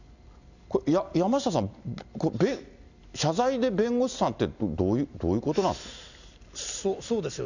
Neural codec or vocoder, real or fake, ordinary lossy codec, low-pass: none; real; none; 7.2 kHz